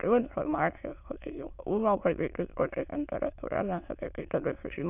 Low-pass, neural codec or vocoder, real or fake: 3.6 kHz; autoencoder, 22.05 kHz, a latent of 192 numbers a frame, VITS, trained on many speakers; fake